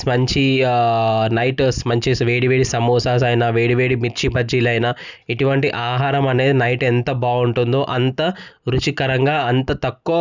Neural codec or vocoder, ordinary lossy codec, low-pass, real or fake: none; none; 7.2 kHz; real